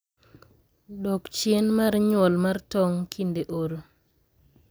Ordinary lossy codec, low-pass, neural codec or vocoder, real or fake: none; none; none; real